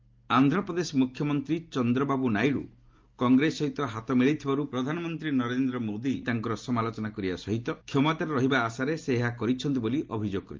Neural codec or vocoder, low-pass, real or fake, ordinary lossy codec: none; 7.2 kHz; real; Opus, 32 kbps